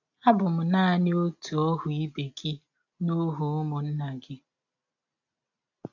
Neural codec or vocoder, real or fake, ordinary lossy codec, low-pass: none; real; AAC, 48 kbps; 7.2 kHz